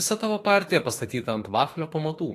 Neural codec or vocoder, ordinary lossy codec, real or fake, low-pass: autoencoder, 48 kHz, 32 numbers a frame, DAC-VAE, trained on Japanese speech; AAC, 48 kbps; fake; 14.4 kHz